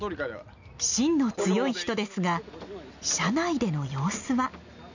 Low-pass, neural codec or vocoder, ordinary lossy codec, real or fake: 7.2 kHz; none; none; real